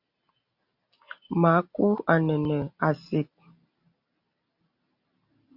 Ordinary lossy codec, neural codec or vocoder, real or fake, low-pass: Opus, 64 kbps; none; real; 5.4 kHz